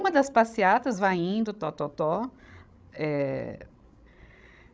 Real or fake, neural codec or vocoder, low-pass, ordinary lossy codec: fake; codec, 16 kHz, 16 kbps, FreqCodec, larger model; none; none